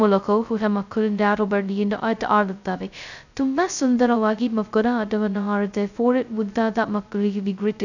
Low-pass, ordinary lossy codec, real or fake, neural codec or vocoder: 7.2 kHz; none; fake; codec, 16 kHz, 0.2 kbps, FocalCodec